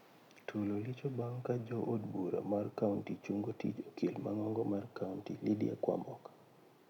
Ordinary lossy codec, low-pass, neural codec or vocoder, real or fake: none; 19.8 kHz; none; real